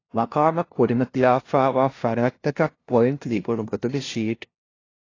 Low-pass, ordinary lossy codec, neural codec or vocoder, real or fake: 7.2 kHz; AAC, 32 kbps; codec, 16 kHz, 0.5 kbps, FunCodec, trained on LibriTTS, 25 frames a second; fake